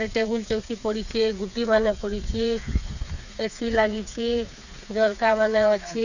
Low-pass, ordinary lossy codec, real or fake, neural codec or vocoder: 7.2 kHz; none; fake; codec, 16 kHz, 4 kbps, FreqCodec, smaller model